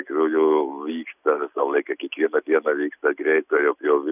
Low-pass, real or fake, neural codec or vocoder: 3.6 kHz; real; none